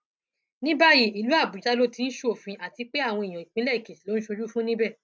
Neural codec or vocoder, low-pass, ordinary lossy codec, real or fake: none; none; none; real